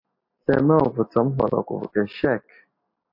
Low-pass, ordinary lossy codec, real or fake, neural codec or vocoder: 5.4 kHz; MP3, 32 kbps; real; none